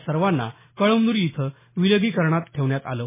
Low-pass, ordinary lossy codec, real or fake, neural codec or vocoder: 3.6 kHz; MP3, 16 kbps; real; none